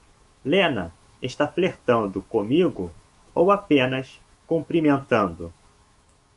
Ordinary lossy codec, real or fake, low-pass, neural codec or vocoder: MP3, 48 kbps; fake; 14.4 kHz; autoencoder, 48 kHz, 128 numbers a frame, DAC-VAE, trained on Japanese speech